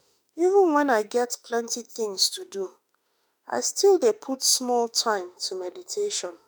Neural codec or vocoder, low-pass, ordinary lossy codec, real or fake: autoencoder, 48 kHz, 32 numbers a frame, DAC-VAE, trained on Japanese speech; none; none; fake